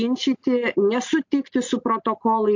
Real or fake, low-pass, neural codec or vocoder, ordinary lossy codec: real; 7.2 kHz; none; MP3, 48 kbps